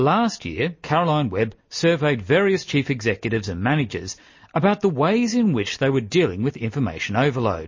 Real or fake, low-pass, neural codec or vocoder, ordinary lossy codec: real; 7.2 kHz; none; MP3, 32 kbps